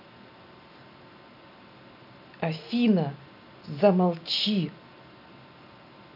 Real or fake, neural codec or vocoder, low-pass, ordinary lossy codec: real; none; 5.4 kHz; none